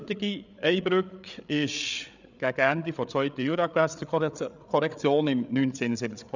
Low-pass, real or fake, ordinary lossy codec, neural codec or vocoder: 7.2 kHz; fake; none; codec, 16 kHz, 8 kbps, FreqCodec, larger model